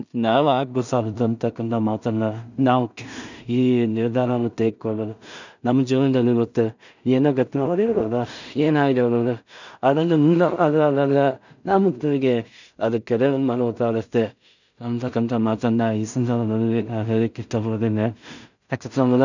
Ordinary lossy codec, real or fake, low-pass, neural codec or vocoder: none; fake; 7.2 kHz; codec, 16 kHz in and 24 kHz out, 0.4 kbps, LongCat-Audio-Codec, two codebook decoder